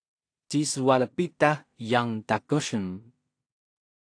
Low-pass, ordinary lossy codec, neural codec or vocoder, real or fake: 9.9 kHz; AAC, 48 kbps; codec, 16 kHz in and 24 kHz out, 0.4 kbps, LongCat-Audio-Codec, two codebook decoder; fake